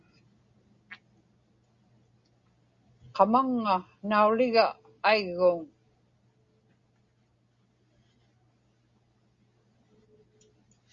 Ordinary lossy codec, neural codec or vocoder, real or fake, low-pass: Opus, 64 kbps; none; real; 7.2 kHz